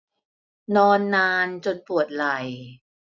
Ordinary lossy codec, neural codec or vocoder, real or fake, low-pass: none; none; real; 7.2 kHz